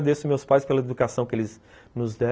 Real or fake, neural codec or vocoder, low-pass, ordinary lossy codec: real; none; none; none